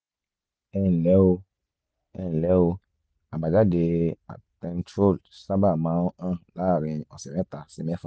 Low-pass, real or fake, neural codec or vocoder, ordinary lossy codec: none; real; none; none